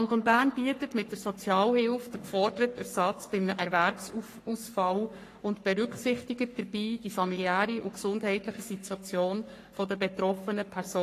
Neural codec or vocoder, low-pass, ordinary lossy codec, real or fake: codec, 44.1 kHz, 3.4 kbps, Pupu-Codec; 14.4 kHz; AAC, 48 kbps; fake